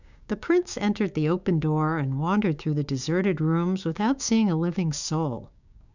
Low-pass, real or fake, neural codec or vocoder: 7.2 kHz; fake; codec, 16 kHz, 6 kbps, DAC